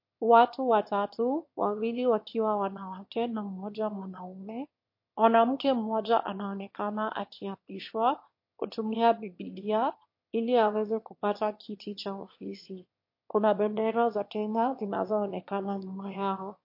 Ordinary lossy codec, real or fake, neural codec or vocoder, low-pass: MP3, 32 kbps; fake; autoencoder, 22.05 kHz, a latent of 192 numbers a frame, VITS, trained on one speaker; 5.4 kHz